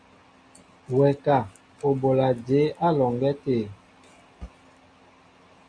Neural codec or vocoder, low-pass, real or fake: none; 9.9 kHz; real